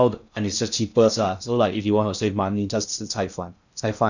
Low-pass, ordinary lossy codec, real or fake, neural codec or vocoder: 7.2 kHz; none; fake; codec, 16 kHz in and 24 kHz out, 0.6 kbps, FocalCodec, streaming, 2048 codes